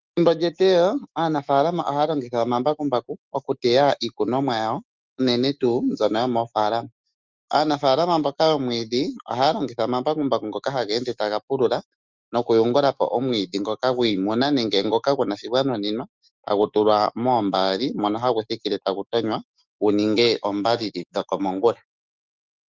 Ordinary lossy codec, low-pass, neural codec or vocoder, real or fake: Opus, 32 kbps; 7.2 kHz; autoencoder, 48 kHz, 128 numbers a frame, DAC-VAE, trained on Japanese speech; fake